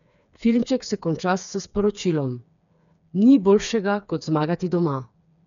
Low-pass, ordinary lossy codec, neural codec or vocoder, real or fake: 7.2 kHz; none; codec, 16 kHz, 4 kbps, FreqCodec, smaller model; fake